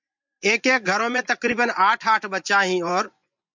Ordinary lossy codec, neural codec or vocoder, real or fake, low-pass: MP3, 64 kbps; vocoder, 24 kHz, 100 mel bands, Vocos; fake; 7.2 kHz